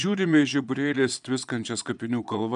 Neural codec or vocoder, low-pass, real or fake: vocoder, 22.05 kHz, 80 mel bands, Vocos; 9.9 kHz; fake